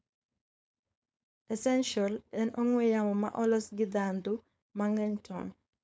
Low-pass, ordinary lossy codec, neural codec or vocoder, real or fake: none; none; codec, 16 kHz, 4.8 kbps, FACodec; fake